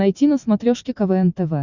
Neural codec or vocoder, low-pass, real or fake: none; 7.2 kHz; real